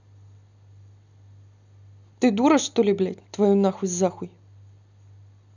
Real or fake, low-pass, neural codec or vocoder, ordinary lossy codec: real; 7.2 kHz; none; none